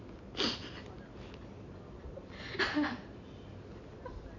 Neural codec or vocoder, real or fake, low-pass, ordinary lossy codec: none; real; 7.2 kHz; none